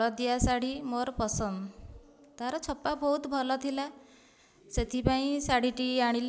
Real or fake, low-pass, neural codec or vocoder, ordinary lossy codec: real; none; none; none